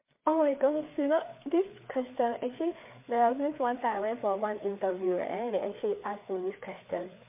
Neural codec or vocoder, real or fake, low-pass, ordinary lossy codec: codec, 16 kHz, 4 kbps, FreqCodec, larger model; fake; 3.6 kHz; MP3, 24 kbps